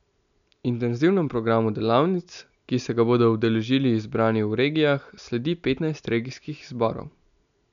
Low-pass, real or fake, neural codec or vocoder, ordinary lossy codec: 7.2 kHz; real; none; none